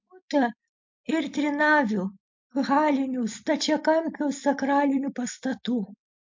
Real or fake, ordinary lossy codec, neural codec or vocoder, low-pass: real; MP3, 48 kbps; none; 7.2 kHz